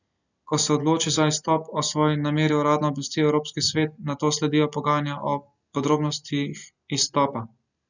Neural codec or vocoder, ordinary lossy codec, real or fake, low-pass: none; none; real; 7.2 kHz